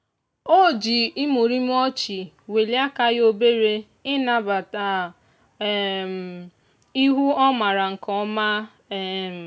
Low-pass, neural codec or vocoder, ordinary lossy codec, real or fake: none; none; none; real